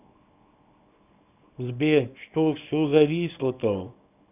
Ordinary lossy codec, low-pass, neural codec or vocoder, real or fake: none; 3.6 kHz; codec, 24 kHz, 0.9 kbps, WavTokenizer, small release; fake